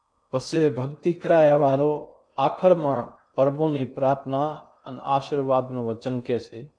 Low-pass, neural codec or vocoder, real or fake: 9.9 kHz; codec, 16 kHz in and 24 kHz out, 0.6 kbps, FocalCodec, streaming, 2048 codes; fake